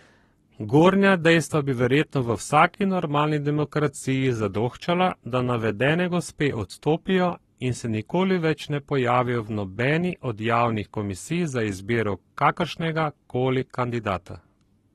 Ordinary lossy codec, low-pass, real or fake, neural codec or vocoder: AAC, 32 kbps; 19.8 kHz; fake; codec, 44.1 kHz, 7.8 kbps, Pupu-Codec